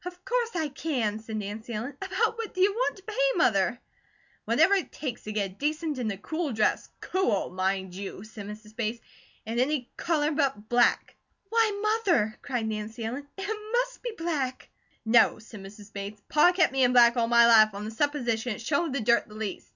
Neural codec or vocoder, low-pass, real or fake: none; 7.2 kHz; real